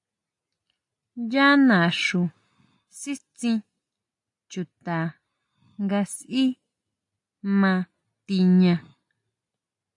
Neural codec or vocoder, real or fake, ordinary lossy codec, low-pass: none; real; AAC, 64 kbps; 10.8 kHz